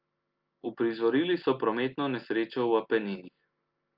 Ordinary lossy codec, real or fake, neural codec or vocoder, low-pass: Opus, 24 kbps; real; none; 5.4 kHz